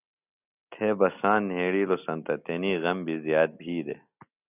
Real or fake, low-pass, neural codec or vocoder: real; 3.6 kHz; none